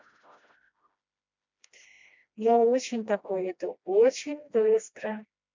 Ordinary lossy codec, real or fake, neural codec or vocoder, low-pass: MP3, 64 kbps; fake; codec, 16 kHz, 1 kbps, FreqCodec, smaller model; 7.2 kHz